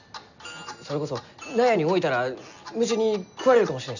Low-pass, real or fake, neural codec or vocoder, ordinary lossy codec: 7.2 kHz; real; none; none